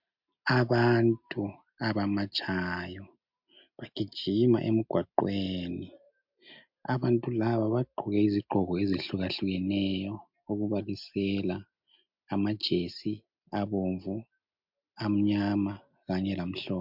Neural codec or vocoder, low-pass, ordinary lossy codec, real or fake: none; 5.4 kHz; MP3, 48 kbps; real